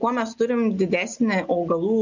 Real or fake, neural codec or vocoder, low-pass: real; none; 7.2 kHz